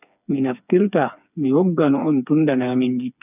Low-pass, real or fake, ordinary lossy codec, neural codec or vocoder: 3.6 kHz; fake; none; codec, 16 kHz, 4 kbps, FreqCodec, smaller model